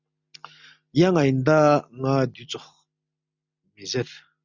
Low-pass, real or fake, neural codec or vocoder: 7.2 kHz; real; none